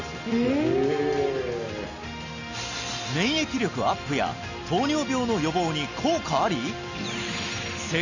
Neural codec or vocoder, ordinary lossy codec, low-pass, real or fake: none; none; 7.2 kHz; real